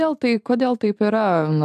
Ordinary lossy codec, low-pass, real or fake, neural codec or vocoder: AAC, 96 kbps; 14.4 kHz; fake; vocoder, 44.1 kHz, 128 mel bands every 512 samples, BigVGAN v2